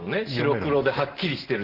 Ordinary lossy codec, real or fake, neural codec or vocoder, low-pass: Opus, 16 kbps; real; none; 5.4 kHz